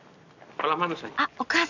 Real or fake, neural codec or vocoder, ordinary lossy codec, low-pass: real; none; none; 7.2 kHz